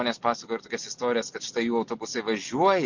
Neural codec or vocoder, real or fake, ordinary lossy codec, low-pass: none; real; MP3, 48 kbps; 7.2 kHz